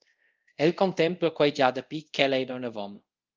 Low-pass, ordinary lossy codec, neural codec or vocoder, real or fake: 7.2 kHz; Opus, 24 kbps; codec, 24 kHz, 0.5 kbps, DualCodec; fake